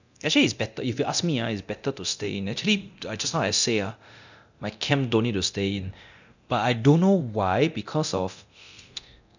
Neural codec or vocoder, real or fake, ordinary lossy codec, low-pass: codec, 24 kHz, 0.9 kbps, DualCodec; fake; none; 7.2 kHz